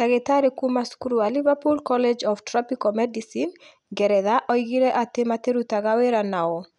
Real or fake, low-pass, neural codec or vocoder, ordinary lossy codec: real; 10.8 kHz; none; none